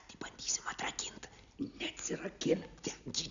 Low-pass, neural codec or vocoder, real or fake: 7.2 kHz; none; real